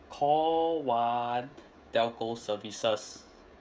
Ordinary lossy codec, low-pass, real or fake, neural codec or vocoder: none; none; fake; codec, 16 kHz, 16 kbps, FreqCodec, smaller model